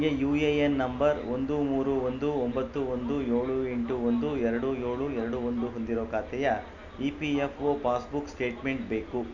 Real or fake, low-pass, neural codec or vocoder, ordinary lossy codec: real; 7.2 kHz; none; none